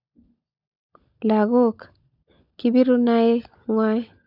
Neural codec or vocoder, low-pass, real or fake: codec, 16 kHz, 16 kbps, FunCodec, trained on LibriTTS, 50 frames a second; 5.4 kHz; fake